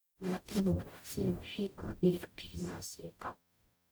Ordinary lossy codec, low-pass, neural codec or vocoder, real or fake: none; none; codec, 44.1 kHz, 0.9 kbps, DAC; fake